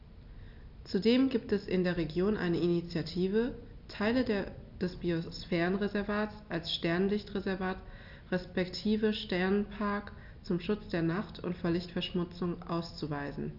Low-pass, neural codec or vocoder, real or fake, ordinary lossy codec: 5.4 kHz; none; real; none